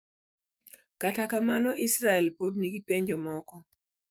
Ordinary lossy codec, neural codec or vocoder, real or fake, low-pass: none; codec, 44.1 kHz, 7.8 kbps, DAC; fake; none